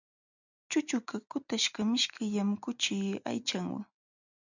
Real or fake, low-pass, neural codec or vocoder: real; 7.2 kHz; none